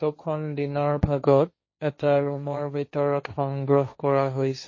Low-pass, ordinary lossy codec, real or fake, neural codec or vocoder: 7.2 kHz; MP3, 32 kbps; fake; codec, 16 kHz, 1.1 kbps, Voila-Tokenizer